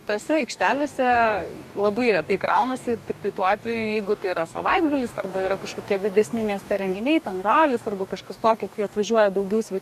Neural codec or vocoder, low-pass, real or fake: codec, 44.1 kHz, 2.6 kbps, DAC; 14.4 kHz; fake